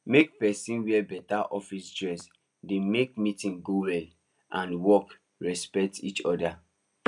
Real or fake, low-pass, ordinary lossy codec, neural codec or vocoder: real; 10.8 kHz; none; none